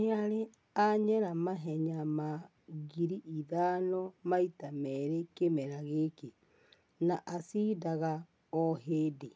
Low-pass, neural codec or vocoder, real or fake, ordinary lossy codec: none; none; real; none